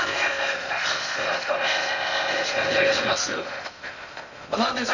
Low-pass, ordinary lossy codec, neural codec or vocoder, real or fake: 7.2 kHz; none; codec, 16 kHz in and 24 kHz out, 0.6 kbps, FocalCodec, streaming, 2048 codes; fake